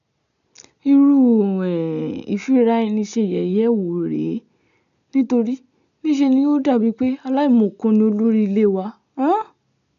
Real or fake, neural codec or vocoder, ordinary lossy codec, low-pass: real; none; none; 7.2 kHz